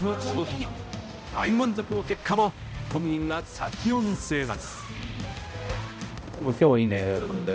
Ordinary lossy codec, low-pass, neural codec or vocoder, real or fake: none; none; codec, 16 kHz, 0.5 kbps, X-Codec, HuBERT features, trained on balanced general audio; fake